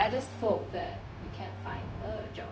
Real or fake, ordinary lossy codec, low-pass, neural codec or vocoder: fake; none; none; codec, 16 kHz, 0.4 kbps, LongCat-Audio-Codec